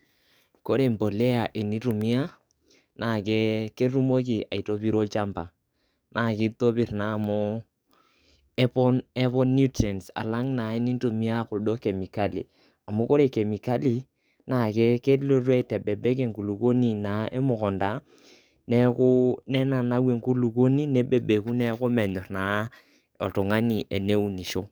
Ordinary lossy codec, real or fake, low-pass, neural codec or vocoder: none; fake; none; codec, 44.1 kHz, 7.8 kbps, DAC